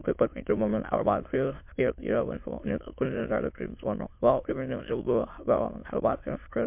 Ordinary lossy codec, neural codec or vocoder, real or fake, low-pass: MP3, 32 kbps; autoencoder, 22.05 kHz, a latent of 192 numbers a frame, VITS, trained on many speakers; fake; 3.6 kHz